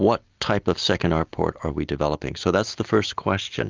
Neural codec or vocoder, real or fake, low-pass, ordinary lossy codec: none; real; 7.2 kHz; Opus, 32 kbps